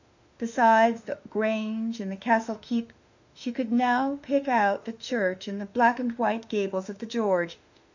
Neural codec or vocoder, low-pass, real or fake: autoencoder, 48 kHz, 32 numbers a frame, DAC-VAE, trained on Japanese speech; 7.2 kHz; fake